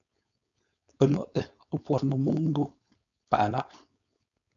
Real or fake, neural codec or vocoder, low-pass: fake; codec, 16 kHz, 4.8 kbps, FACodec; 7.2 kHz